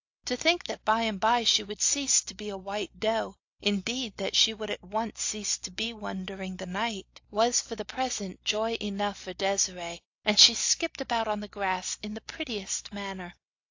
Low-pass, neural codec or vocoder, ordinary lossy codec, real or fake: 7.2 kHz; none; AAC, 48 kbps; real